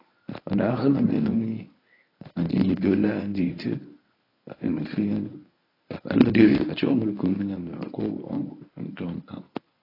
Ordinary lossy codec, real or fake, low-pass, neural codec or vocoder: AAC, 24 kbps; fake; 5.4 kHz; codec, 24 kHz, 0.9 kbps, WavTokenizer, medium speech release version 1